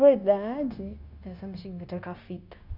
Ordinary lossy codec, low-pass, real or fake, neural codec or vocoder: none; 5.4 kHz; fake; codec, 16 kHz, 0.9 kbps, LongCat-Audio-Codec